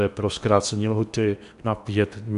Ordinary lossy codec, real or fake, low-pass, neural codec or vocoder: MP3, 96 kbps; fake; 10.8 kHz; codec, 16 kHz in and 24 kHz out, 0.8 kbps, FocalCodec, streaming, 65536 codes